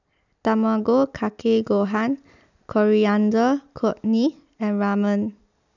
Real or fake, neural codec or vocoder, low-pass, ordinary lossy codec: real; none; 7.2 kHz; none